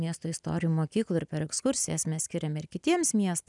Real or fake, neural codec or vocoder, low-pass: real; none; 10.8 kHz